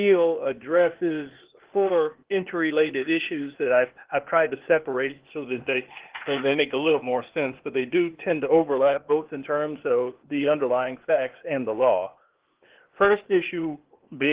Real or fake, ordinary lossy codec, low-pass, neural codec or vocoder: fake; Opus, 16 kbps; 3.6 kHz; codec, 16 kHz, 0.8 kbps, ZipCodec